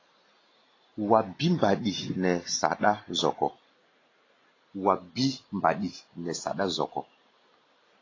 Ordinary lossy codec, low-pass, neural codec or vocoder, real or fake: AAC, 32 kbps; 7.2 kHz; none; real